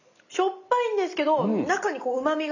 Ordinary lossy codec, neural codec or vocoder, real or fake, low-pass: none; none; real; 7.2 kHz